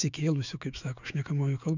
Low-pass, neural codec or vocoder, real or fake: 7.2 kHz; autoencoder, 48 kHz, 128 numbers a frame, DAC-VAE, trained on Japanese speech; fake